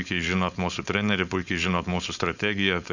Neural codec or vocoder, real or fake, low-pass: codec, 16 kHz, 4.8 kbps, FACodec; fake; 7.2 kHz